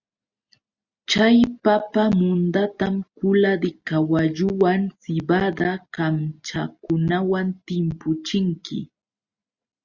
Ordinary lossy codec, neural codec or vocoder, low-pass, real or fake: Opus, 64 kbps; none; 7.2 kHz; real